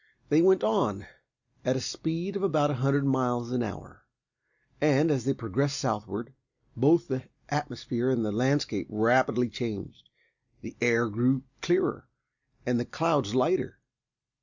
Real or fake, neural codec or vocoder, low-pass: real; none; 7.2 kHz